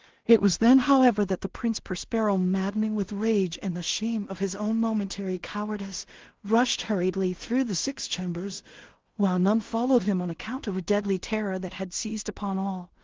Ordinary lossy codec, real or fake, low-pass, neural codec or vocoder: Opus, 16 kbps; fake; 7.2 kHz; codec, 16 kHz in and 24 kHz out, 0.4 kbps, LongCat-Audio-Codec, two codebook decoder